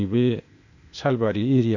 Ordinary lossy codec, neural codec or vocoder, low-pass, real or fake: none; codec, 16 kHz, 0.8 kbps, ZipCodec; 7.2 kHz; fake